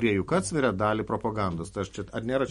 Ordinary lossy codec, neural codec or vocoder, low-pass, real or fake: MP3, 48 kbps; none; 19.8 kHz; real